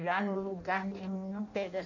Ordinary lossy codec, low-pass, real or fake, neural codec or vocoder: none; 7.2 kHz; fake; codec, 16 kHz in and 24 kHz out, 0.6 kbps, FireRedTTS-2 codec